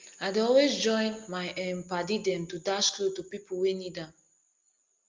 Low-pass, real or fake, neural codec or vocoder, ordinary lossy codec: 7.2 kHz; real; none; Opus, 24 kbps